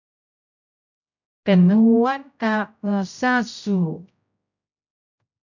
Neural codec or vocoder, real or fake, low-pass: codec, 16 kHz, 0.5 kbps, X-Codec, HuBERT features, trained on general audio; fake; 7.2 kHz